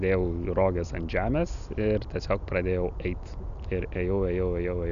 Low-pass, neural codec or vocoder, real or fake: 7.2 kHz; none; real